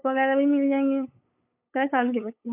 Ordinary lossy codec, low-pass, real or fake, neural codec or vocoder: AAC, 24 kbps; 3.6 kHz; fake; codec, 16 kHz, 8 kbps, FunCodec, trained on LibriTTS, 25 frames a second